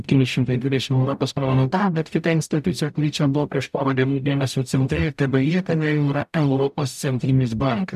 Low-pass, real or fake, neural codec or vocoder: 14.4 kHz; fake; codec, 44.1 kHz, 0.9 kbps, DAC